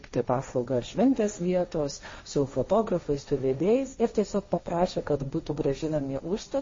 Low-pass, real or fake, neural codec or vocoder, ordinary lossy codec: 7.2 kHz; fake; codec, 16 kHz, 1.1 kbps, Voila-Tokenizer; MP3, 32 kbps